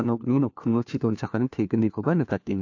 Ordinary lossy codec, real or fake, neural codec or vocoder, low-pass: AAC, 48 kbps; fake; codec, 16 kHz, 1 kbps, FunCodec, trained on LibriTTS, 50 frames a second; 7.2 kHz